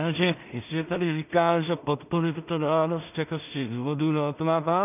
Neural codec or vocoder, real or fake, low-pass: codec, 16 kHz in and 24 kHz out, 0.4 kbps, LongCat-Audio-Codec, two codebook decoder; fake; 3.6 kHz